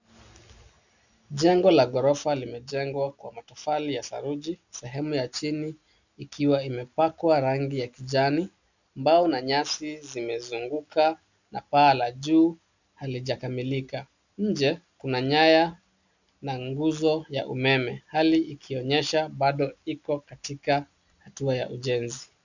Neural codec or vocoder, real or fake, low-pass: none; real; 7.2 kHz